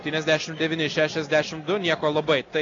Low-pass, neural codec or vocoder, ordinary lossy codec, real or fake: 7.2 kHz; none; AAC, 32 kbps; real